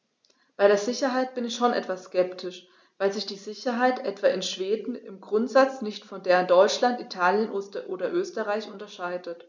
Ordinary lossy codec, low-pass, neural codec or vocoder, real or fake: none; none; none; real